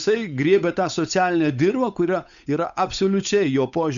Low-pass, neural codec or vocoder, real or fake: 7.2 kHz; codec, 16 kHz, 8 kbps, FunCodec, trained on Chinese and English, 25 frames a second; fake